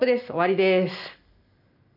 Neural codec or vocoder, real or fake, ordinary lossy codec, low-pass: none; real; none; 5.4 kHz